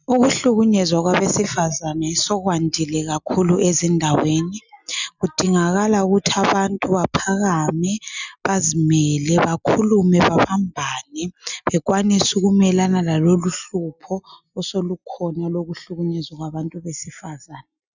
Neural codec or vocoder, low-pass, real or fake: none; 7.2 kHz; real